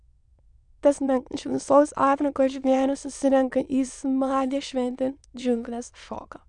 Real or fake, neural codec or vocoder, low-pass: fake; autoencoder, 22.05 kHz, a latent of 192 numbers a frame, VITS, trained on many speakers; 9.9 kHz